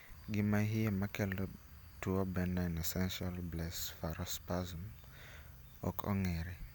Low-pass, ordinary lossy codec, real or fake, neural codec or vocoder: none; none; real; none